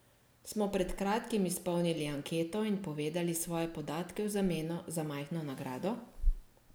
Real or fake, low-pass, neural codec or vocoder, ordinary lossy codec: real; none; none; none